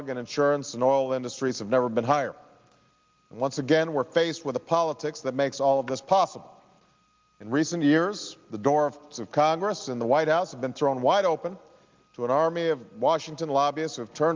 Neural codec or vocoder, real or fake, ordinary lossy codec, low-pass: none; real; Opus, 32 kbps; 7.2 kHz